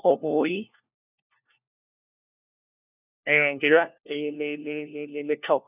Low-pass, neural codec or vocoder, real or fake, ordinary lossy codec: 3.6 kHz; codec, 16 kHz, 1 kbps, FunCodec, trained on LibriTTS, 50 frames a second; fake; none